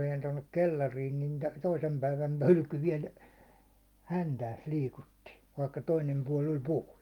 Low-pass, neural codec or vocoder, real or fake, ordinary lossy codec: 19.8 kHz; none; real; Opus, 32 kbps